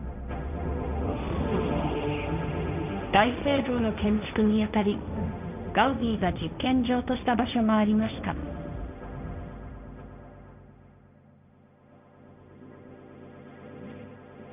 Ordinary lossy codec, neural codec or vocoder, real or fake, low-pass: none; codec, 16 kHz, 1.1 kbps, Voila-Tokenizer; fake; 3.6 kHz